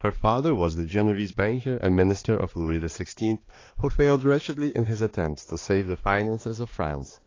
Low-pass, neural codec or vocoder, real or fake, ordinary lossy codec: 7.2 kHz; codec, 16 kHz, 2 kbps, X-Codec, HuBERT features, trained on balanced general audio; fake; AAC, 32 kbps